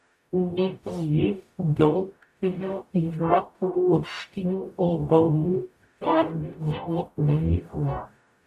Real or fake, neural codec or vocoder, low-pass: fake; codec, 44.1 kHz, 0.9 kbps, DAC; 14.4 kHz